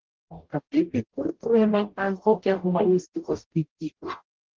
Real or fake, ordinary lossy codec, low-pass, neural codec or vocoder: fake; Opus, 16 kbps; 7.2 kHz; codec, 44.1 kHz, 0.9 kbps, DAC